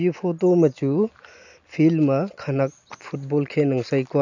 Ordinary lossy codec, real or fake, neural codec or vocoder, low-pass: none; real; none; 7.2 kHz